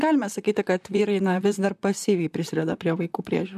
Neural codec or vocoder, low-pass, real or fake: vocoder, 44.1 kHz, 128 mel bands, Pupu-Vocoder; 14.4 kHz; fake